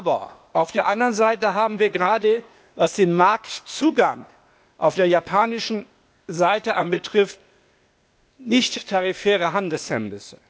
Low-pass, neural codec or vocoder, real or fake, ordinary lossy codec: none; codec, 16 kHz, 0.8 kbps, ZipCodec; fake; none